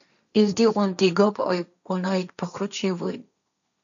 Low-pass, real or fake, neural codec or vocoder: 7.2 kHz; fake; codec, 16 kHz, 1.1 kbps, Voila-Tokenizer